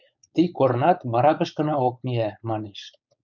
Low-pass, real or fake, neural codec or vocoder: 7.2 kHz; fake; codec, 16 kHz, 4.8 kbps, FACodec